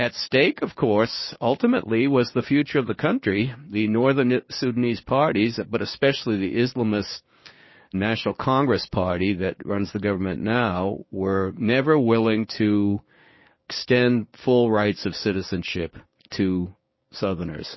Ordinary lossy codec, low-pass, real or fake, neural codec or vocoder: MP3, 24 kbps; 7.2 kHz; fake; codec, 16 kHz, 6 kbps, DAC